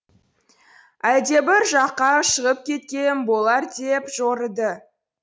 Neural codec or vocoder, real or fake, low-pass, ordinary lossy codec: none; real; none; none